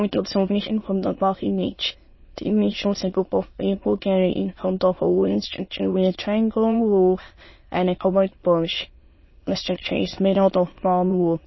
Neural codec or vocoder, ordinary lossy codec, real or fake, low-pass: autoencoder, 22.05 kHz, a latent of 192 numbers a frame, VITS, trained on many speakers; MP3, 24 kbps; fake; 7.2 kHz